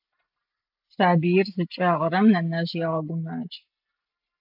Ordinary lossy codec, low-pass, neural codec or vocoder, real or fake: AAC, 48 kbps; 5.4 kHz; none; real